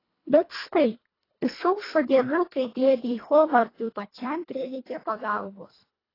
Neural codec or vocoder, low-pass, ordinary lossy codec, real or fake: codec, 24 kHz, 1.5 kbps, HILCodec; 5.4 kHz; AAC, 24 kbps; fake